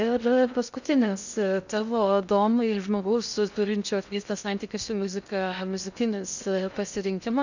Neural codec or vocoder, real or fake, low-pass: codec, 16 kHz in and 24 kHz out, 0.6 kbps, FocalCodec, streaming, 2048 codes; fake; 7.2 kHz